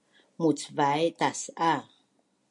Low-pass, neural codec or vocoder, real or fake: 10.8 kHz; none; real